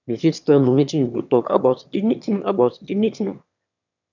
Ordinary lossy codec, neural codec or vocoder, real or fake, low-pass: none; autoencoder, 22.05 kHz, a latent of 192 numbers a frame, VITS, trained on one speaker; fake; 7.2 kHz